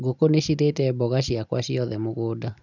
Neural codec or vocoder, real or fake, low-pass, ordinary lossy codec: none; real; 7.2 kHz; none